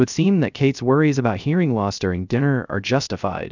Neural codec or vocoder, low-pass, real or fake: codec, 16 kHz, 0.3 kbps, FocalCodec; 7.2 kHz; fake